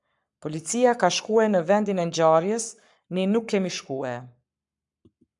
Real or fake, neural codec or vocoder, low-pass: fake; codec, 44.1 kHz, 7.8 kbps, Pupu-Codec; 10.8 kHz